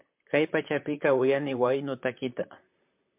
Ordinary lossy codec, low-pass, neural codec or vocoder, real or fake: MP3, 32 kbps; 3.6 kHz; vocoder, 44.1 kHz, 128 mel bands, Pupu-Vocoder; fake